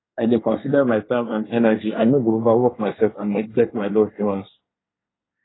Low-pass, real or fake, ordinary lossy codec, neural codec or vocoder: 7.2 kHz; fake; AAC, 16 kbps; codec, 24 kHz, 1 kbps, SNAC